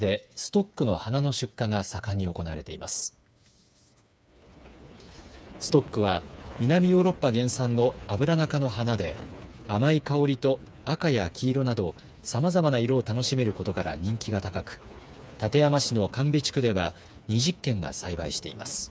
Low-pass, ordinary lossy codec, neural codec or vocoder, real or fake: none; none; codec, 16 kHz, 4 kbps, FreqCodec, smaller model; fake